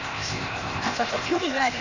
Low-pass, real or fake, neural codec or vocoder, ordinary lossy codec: 7.2 kHz; fake; codec, 16 kHz, 0.8 kbps, ZipCodec; none